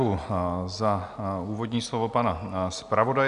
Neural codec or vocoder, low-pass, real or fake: none; 10.8 kHz; real